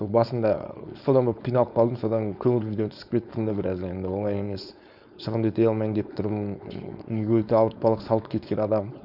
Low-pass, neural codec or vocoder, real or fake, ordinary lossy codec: 5.4 kHz; codec, 16 kHz, 4.8 kbps, FACodec; fake; none